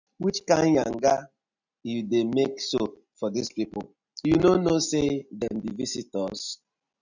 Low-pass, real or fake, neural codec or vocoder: 7.2 kHz; real; none